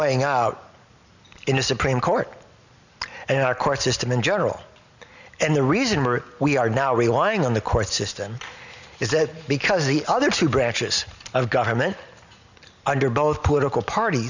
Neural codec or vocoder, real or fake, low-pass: none; real; 7.2 kHz